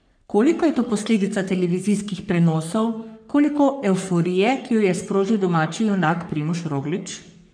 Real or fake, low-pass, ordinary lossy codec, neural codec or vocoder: fake; 9.9 kHz; MP3, 96 kbps; codec, 44.1 kHz, 3.4 kbps, Pupu-Codec